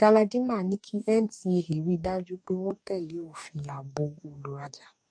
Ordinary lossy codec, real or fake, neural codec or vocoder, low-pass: AAC, 64 kbps; fake; codec, 44.1 kHz, 2.6 kbps, DAC; 9.9 kHz